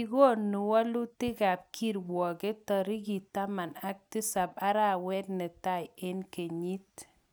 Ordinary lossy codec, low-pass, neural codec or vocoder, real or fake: none; none; none; real